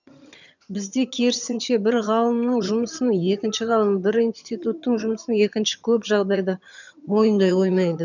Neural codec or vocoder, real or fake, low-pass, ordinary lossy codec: vocoder, 22.05 kHz, 80 mel bands, HiFi-GAN; fake; 7.2 kHz; none